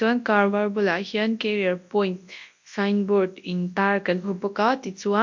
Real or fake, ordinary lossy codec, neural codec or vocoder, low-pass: fake; MP3, 48 kbps; codec, 24 kHz, 0.9 kbps, WavTokenizer, large speech release; 7.2 kHz